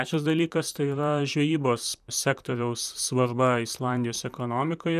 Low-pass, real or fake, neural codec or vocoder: 14.4 kHz; fake; vocoder, 44.1 kHz, 128 mel bands, Pupu-Vocoder